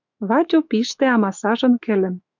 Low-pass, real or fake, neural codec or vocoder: 7.2 kHz; fake; autoencoder, 48 kHz, 128 numbers a frame, DAC-VAE, trained on Japanese speech